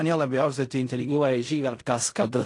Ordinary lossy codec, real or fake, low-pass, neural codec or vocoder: AAC, 48 kbps; fake; 10.8 kHz; codec, 16 kHz in and 24 kHz out, 0.4 kbps, LongCat-Audio-Codec, fine tuned four codebook decoder